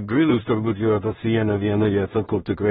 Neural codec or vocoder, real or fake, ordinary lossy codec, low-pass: codec, 16 kHz in and 24 kHz out, 0.4 kbps, LongCat-Audio-Codec, two codebook decoder; fake; AAC, 16 kbps; 10.8 kHz